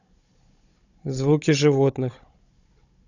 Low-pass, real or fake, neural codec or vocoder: 7.2 kHz; fake; codec, 16 kHz, 16 kbps, FunCodec, trained on Chinese and English, 50 frames a second